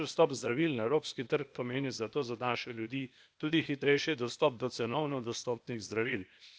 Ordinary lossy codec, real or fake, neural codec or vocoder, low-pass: none; fake; codec, 16 kHz, 0.8 kbps, ZipCodec; none